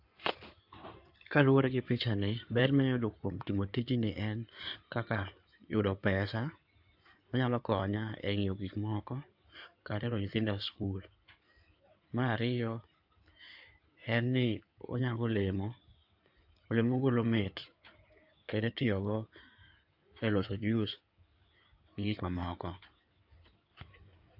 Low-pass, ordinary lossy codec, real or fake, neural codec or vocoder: 5.4 kHz; AAC, 48 kbps; fake; codec, 16 kHz in and 24 kHz out, 2.2 kbps, FireRedTTS-2 codec